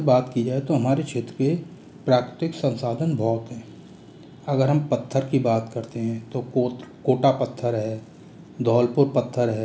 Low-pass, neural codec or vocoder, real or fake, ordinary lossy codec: none; none; real; none